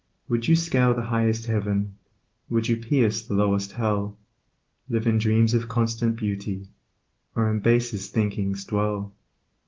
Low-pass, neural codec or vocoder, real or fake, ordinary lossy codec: 7.2 kHz; none; real; Opus, 16 kbps